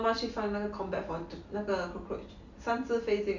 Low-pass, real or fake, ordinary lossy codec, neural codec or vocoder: 7.2 kHz; real; none; none